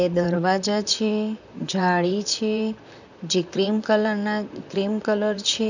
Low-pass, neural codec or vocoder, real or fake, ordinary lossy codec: 7.2 kHz; vocoder, 44.1 kHz, 128 mel bands, Pupu-Vocoder; fake; none